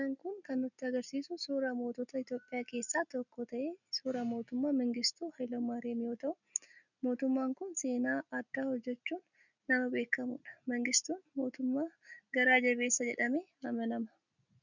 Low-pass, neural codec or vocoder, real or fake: 7.2 kHz; none; real